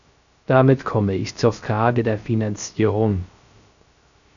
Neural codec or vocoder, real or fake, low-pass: codec, 16 kHz, 0.3 kbps, FocalCodec; fake; 7.2 kHz